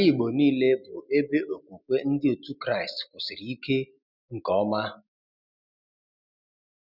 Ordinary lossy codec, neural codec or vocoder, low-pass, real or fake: none; none; 5.4 kHz; real